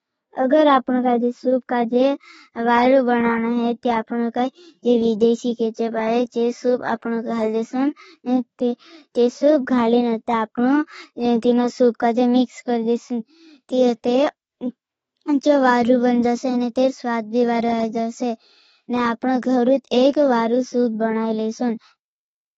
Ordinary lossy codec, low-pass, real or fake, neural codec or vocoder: AAC, 24 kbps; 19.8 kHz; fake; autoencoder, 48 kHz, 128 numbers a frame, DAC-VAE, trained on Japanese speech